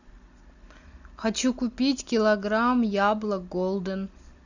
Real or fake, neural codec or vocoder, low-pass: real; none; 7.2 kHz